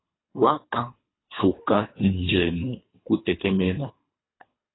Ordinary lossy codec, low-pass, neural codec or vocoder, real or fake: AAC, 16 kbps; 7.2 kHz; codec, 24 kHz, 3 kbps, HILCodec; fake